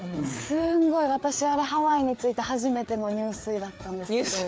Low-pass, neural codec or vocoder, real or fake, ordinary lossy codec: none; codec, 16 kHz, 4 kbps, FreqCodec, larger model; fake; none